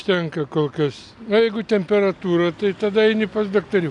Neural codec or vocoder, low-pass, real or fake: none; 10.8 kHz; real